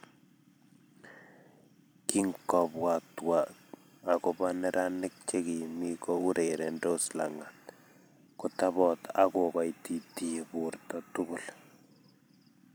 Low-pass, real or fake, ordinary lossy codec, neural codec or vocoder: none; fake; none; vocoder, 44.1 kHz, 128 mel bands every 256 samples, BigVGAN v2